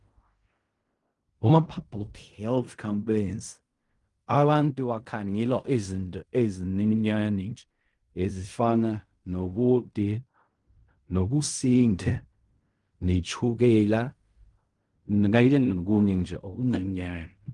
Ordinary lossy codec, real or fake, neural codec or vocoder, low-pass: Opus, 32 kbps; fake; codec, 16 kHz in and 24 kHz out, 0.4 kbps, LongCat-Audio-Codec, fine tuned four codebook decoder; 10.8 kHz